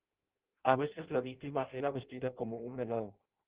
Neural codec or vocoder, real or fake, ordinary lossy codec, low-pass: codec, 16 kHz in and 24 kHz out, 0.6 kbps, FireRedTTS-2 codec; fake; Opus, 16 kbps; 3.6 kHz